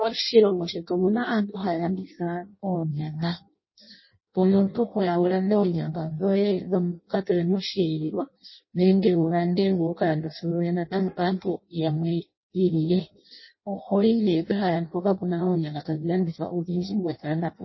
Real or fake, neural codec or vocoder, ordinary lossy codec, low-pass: fake; codec, 16 kHz in and 24 kHz out, 0.6 kbps, FireRedTTS-2 codec; MP3, 24 kbps; 7.2 kHz